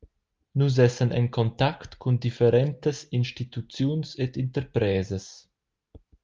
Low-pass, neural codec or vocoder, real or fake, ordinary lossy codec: 7.2 kHz; none; real; Opus, 24 kbps